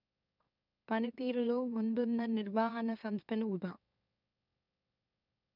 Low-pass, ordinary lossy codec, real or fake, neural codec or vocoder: 5.4 kHz; none; fake; autoencoder, 44.1 kHz, a latent of 192 numbers a frame, MeloTTS